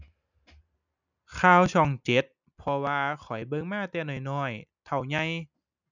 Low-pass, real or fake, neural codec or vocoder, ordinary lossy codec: 7.2 kHz; real; none; none